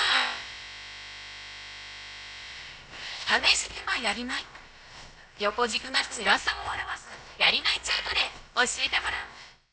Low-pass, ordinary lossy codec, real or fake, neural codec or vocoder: none; none; fake; codec, 16 kHz, about 1 kbps, DyCAST, with the encoder's durations